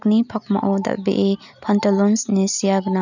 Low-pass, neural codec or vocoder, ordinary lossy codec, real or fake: 7.2 kHz; none; none; real